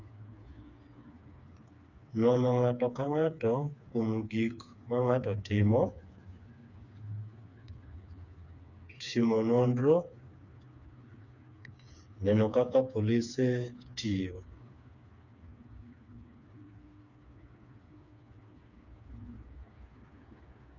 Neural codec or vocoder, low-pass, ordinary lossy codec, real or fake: codec, 16 kHz, 4 kbps, FreqCodec, smaller model; 7.2 kHz; none; fake